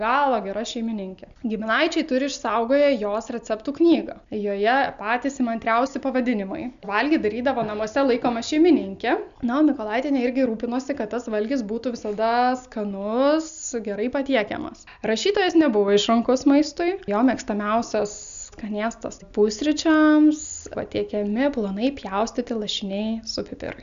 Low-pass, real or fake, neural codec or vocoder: 7.2 kHz; real; none